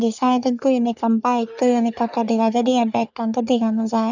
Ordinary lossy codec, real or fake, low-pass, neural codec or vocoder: none; fake; 7.2 kHz; codec, 44.1 kHz, 3.4 kbps, Pupu-Codec